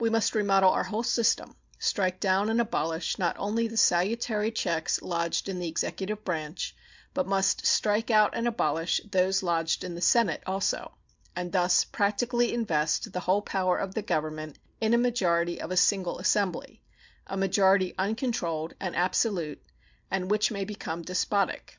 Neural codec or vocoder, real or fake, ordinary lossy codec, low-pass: none; real; MP3, 64 kbps; 7.2 kHz